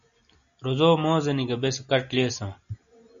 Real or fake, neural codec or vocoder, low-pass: real; none; 7.2 kHz